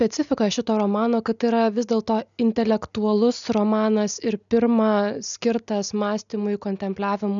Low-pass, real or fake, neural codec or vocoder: 7.2 kHz; real; none